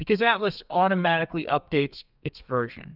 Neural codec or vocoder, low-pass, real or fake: codec, 32 kHz, 1.9 kbps, SNAC; 5.4 kHz; fake